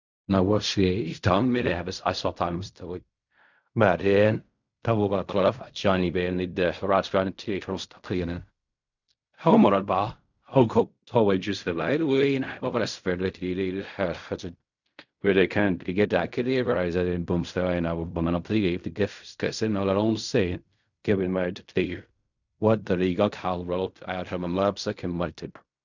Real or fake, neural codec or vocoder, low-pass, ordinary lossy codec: fake; codec, 16 kHz in and 24 kHz out, 0.4 kbps, LongCat-Audio-Codec, fine tuned four codebook decoder; 7.2 kHz; none